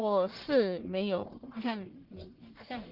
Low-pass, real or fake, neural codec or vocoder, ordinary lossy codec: 5.4 kHz; fake; codec, 44.1 kHz, 1.7 kbps, Pupu-Codec; Opus, 16 kbps